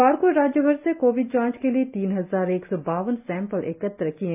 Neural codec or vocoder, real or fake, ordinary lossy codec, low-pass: none; real; AAC, 32 kbps; 3.6 kHz